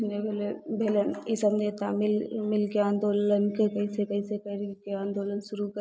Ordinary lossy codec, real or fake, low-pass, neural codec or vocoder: none; real; none; none